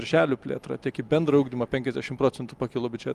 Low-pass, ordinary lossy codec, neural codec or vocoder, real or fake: 14.4 kHz; Opus, 32 kbps; none; real